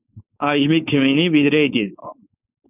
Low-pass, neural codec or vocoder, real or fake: 3.6 kHz; codec, 16 kHz, 4.8 kbps, FACodec; fake